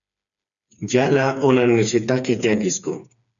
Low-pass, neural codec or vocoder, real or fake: 7.2 kHz; codec, 16 kHz, 4 kbps, FreqCodec, smaller model; fake